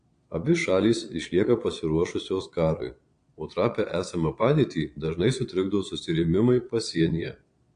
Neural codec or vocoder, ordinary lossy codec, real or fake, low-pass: vocoder, 22.05 kHz, 80 mel bands, Vocos; AAC, 48 kbps; fake; 9.9 kHz